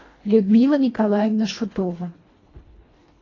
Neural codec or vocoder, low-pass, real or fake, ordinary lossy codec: codec, 24 kHz, 1.5 kbps, HILCodec; 7.2 kHz; fake; AAC, 32 kbps